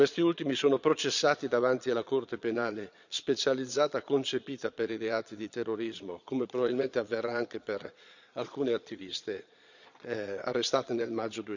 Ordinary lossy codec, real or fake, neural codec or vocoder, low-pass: none; fake; vocoder, 22.05 kHz, 80 mel bands, Vocos; 7.2 kHz